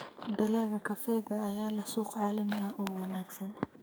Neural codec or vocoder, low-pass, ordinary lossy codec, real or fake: codec, 44.1 kHz, 2.6 kbps, SNAC; none; none; fake